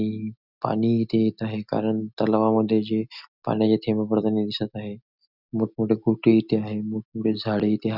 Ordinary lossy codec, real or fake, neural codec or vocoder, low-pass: none; real; none; 5.4 kHz